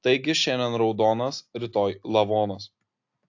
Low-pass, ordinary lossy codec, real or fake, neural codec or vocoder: 7.2 kHz; AAC, 48 kbps; real; none